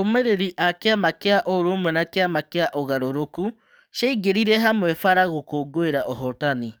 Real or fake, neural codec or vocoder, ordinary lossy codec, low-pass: fake; codec, 44.1 kHz, 7.8 kbps, DAC; none; none